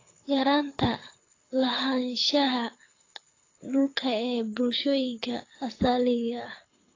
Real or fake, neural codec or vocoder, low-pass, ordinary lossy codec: fake; codec, 24 kHz, 6 kbps, HILCodec; 7.2 kHz; AAC, 32 kbps